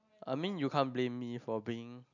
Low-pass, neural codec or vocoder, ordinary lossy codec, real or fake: 7.2 kHz; none; none; real